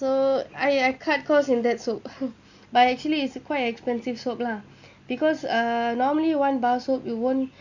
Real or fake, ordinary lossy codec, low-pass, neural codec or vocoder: real; Opus, 64 kbps; 7.2 kHz; none